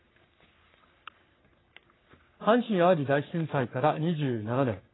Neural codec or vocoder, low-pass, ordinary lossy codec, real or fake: codec, 44.1 kHz, 3.4 kbps, Pupu-Codec; 7.2 kHz; AAC, 16 kbps; fake